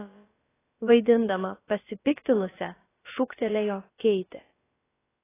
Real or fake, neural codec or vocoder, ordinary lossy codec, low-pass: fake; codec, 16 kHz, about 1 kbps, DyCAST, with the encoder's durations; AAC, 16 kbps; 3.6 kHz